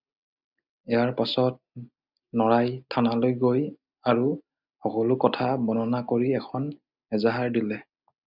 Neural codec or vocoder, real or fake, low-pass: none; real; 5.4 kHz